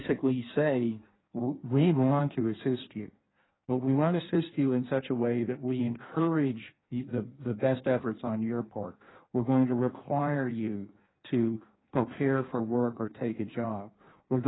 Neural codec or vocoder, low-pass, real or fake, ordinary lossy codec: codec, 16 kHz in and 24 kHz out, 1.1 kbps, FireRedTTS-2 codec; 7.2 kHz; fake; AAC, 16 kbps